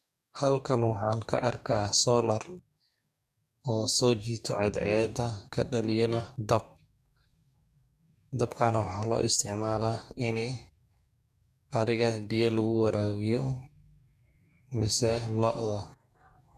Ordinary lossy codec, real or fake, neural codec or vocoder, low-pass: none; fake; codec, 44.1 kHz, 2.6 kbps, DAC; 14.4 kHz